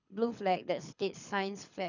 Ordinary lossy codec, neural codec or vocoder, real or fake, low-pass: none; codec, 24 kHz, 6 kbps, HILCodec; fake; 7.2 kHz